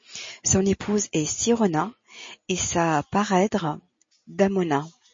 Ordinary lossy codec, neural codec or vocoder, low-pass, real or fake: MP3, 32 kbps; none; 7.2 kHz; real